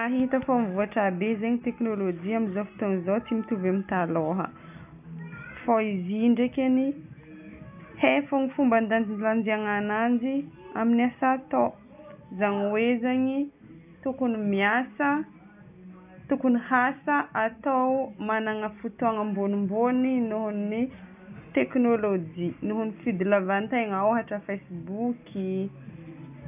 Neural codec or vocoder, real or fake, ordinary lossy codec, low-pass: none; real; none; 3.6 kHz